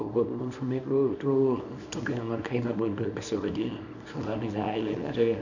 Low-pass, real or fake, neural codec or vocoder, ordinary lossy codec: 7.2 kHz; fake; codec, 24 kHz, 0.9 kbps, WavTokenizer, small release; none